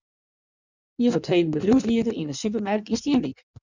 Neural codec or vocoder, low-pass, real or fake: codec, 16 kHz in and 24 kHz out, 1.1 kbps, FireRedTTS-2 codec; 7.2 kHz; fake